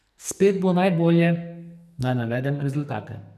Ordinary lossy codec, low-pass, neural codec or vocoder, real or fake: none; 14.4 kHz; codec, 44.1 kHz, 2.6 kbps, SNAC; fake